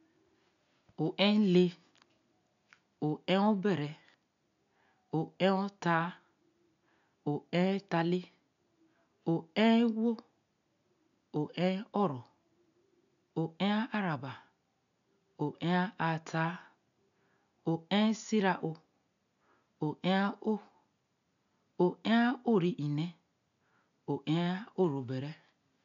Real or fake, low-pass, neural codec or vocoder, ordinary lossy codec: real; 7.2 kHz; none; none